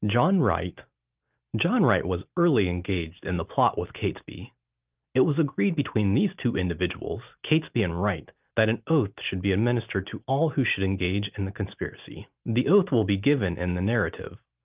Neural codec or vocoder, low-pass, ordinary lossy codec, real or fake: none; 3.6 kHz; Opus, 24 kbps; real